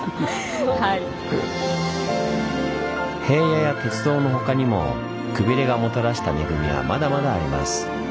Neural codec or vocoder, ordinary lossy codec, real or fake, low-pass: none; none; real; none